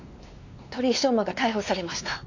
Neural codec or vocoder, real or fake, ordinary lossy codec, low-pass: codec, 16 kHz, 2 kbps, X-Codec, WavLM features, trained on Multilingual LibriSpeech; fake; AAC, 48 kbps; 7.2 kHz